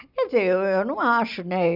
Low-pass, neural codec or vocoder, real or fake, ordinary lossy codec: 5.4 kHz; none; real; none